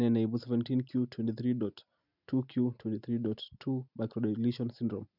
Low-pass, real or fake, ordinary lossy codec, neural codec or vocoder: 5.4 kHz; real; none; none